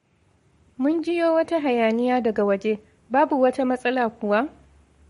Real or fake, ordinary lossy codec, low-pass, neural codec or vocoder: fake; MP3, 48 kbps; 19.8 kHz; codec, 44.1 kHz, 7.8 kbps, Pupu-Codec